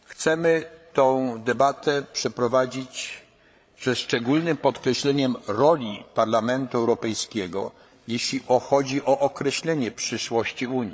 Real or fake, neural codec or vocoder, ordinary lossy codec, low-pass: fake; codec, 16 kHz, 8 kbps, FreqCodec, larger model; none; none